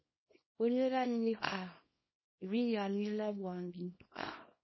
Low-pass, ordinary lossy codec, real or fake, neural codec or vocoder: 7.2 kHz; MP3, 24 kbps; fake; codec, 24 kHz, 0.9 kbps, WavTokenizer, small release